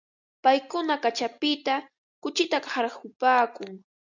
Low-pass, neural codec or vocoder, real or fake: 7.2 kHz; none; real